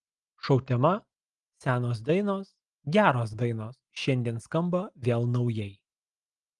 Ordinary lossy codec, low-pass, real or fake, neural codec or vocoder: Opus, 24 kbps; 10.8 kHz; real; none